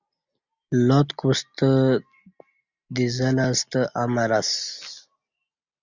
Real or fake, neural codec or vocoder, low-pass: real; none; 7.2 kHz